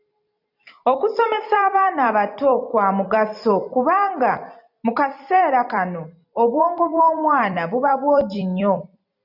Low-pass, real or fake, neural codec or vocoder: 5.4 kHz; fake; vocoder, 44.1 kHz, 128 mel bands every 256 samples, BigVGAN v2